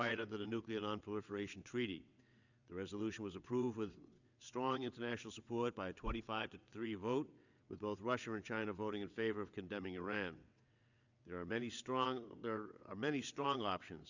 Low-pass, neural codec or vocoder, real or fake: 7.2 kHz; vocoder, 22.05 kHz, 80 mel bands, WaveNeXt; fake